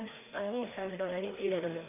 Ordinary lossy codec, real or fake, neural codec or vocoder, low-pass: none; fake; codec, 16 kHz, 2 kbps, FreqCodec, larger model; 3.6 kHz